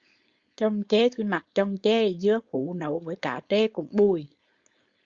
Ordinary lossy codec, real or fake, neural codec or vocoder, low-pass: Opus, 64 kbps; fake; codec, 16 kHz, 4.8 kbps, FACodec; 7.2 kHz